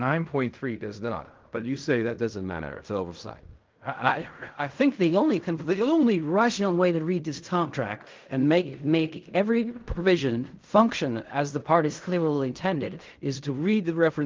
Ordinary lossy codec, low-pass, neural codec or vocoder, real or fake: Opus, 32 kbps; 7.2 kHz; codec, 16 kHz in and 24 kHz out, 0.4 kbps, LongCat-Audio-Codec, fine tuned four codebook decoder; fake